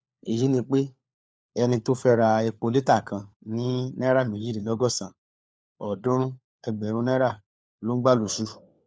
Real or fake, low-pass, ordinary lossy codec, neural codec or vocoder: fake; none; none; codec, 16 kHz, 4 kbps, FunCodec, trained on LibriTTS, 50 frames a second